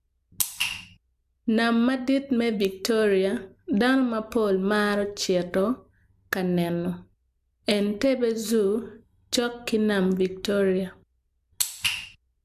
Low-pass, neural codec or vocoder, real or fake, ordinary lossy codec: 14.4 kHz; none; real; AAC, 96 kbps